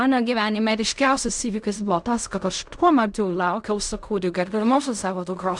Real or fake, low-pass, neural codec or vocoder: fake; 10.8 kHz; codec, 16 kHz in and 24 kHz out, 0.4 kbps, LongCat-Audio-Codec, fine tuned four codebook decoder